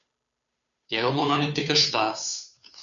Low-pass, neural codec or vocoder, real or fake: 7.2 kHz; codec, 16 kHz, 2 kbps, FunCodec, trained on Chinese and English, 25 frames a second; fake